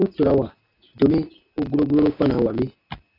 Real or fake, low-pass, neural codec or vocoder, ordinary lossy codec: real; 5.4 kHz; none; AAC, 32 kbps